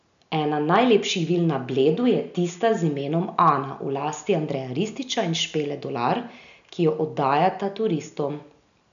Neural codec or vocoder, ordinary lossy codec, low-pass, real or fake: none; none; 7.2 kHz; real